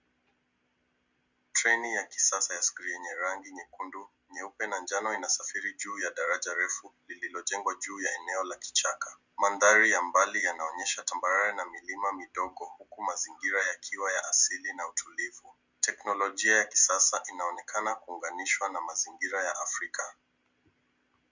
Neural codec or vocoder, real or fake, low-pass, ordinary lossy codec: none; real; 7.2 kHz; Opus, 64 kbps